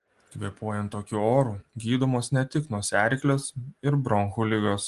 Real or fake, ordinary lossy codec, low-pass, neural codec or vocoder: real; Opus, 24 kbps; 10.8 kHz; none